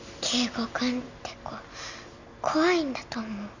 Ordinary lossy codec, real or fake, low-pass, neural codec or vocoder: none; real; 7.2 kHz; none